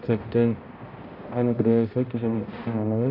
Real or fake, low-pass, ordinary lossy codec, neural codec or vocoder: fake; 5.4 kHz; AAC, 32 kbps; codec, 16 kHz, 0.5 kbps, X-Codec, HuBERT features, trained on balanced general audio